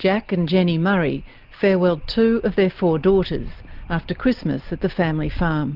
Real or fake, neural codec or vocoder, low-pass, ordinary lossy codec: real; none; 5.4 kHz; Opus, 32 kbps